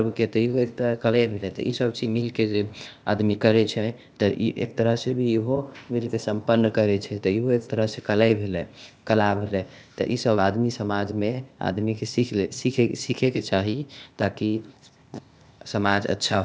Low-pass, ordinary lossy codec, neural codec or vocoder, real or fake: none; none; codec, 16 kHz, 0.8 kbps, ZipCodec; fake